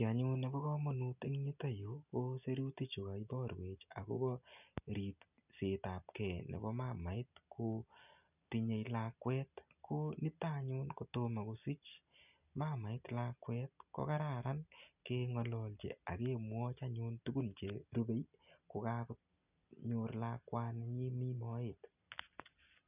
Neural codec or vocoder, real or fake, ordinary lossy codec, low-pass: none; real; none; 3.6 kHz